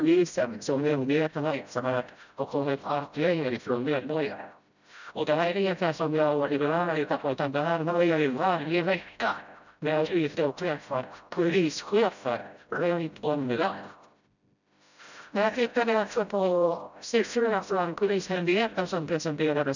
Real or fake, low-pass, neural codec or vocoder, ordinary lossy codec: fake; 7.2 kHz; codec, 16 kHz, 0.5 kbps, FreqCodec, smaller model; none